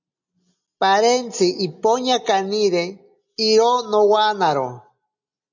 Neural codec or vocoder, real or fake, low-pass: none; real; 7.2 kHz